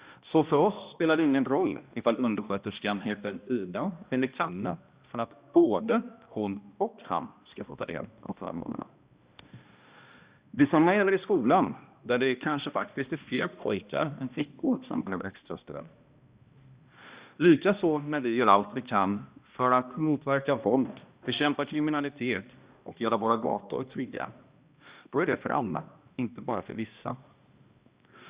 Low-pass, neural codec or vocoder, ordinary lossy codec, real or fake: 3.6 kHz; codec, 16 kHz, 1 kbps, X-Codec, HuBERT features, trained on balanced general audio; Opus, 64 kbps; fake